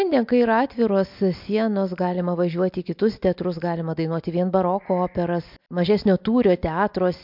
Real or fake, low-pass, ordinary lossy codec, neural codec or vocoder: real; 5.4 kHz; MP3, 48 kbps; none